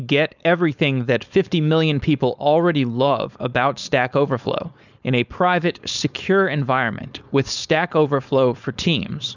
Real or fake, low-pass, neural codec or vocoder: fake; 7.2 kHz; codec, 16 kHz, 4.8 kbps, FACodec